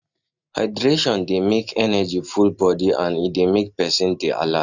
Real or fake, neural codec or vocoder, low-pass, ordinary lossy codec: real; none; 7.2 kHz; none